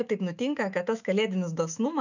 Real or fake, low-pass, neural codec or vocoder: fake; 7.2 kHz; autoencoder, 48 kHz, 128 numbers a frame, DAC-VAE, trained on Japanese speech